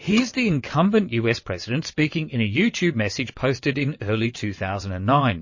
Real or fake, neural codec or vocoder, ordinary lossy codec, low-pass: fake; vocoder, 22.05 kHz, 80 mel bands, WaveNeXt; MP3, 32 kbps; 7.2 kHz